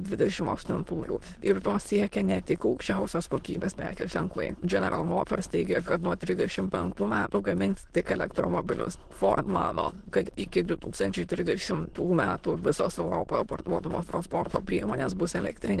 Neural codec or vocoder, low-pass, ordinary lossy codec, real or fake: autoencoder, 22.05 kHz, a latent of 192 numbers a frame, VITS, trained on many speakers; 9.9 kHz; Opus, 16 kbps; fake